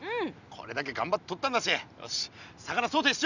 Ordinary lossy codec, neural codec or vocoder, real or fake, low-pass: none; none; real; 7.2 kHz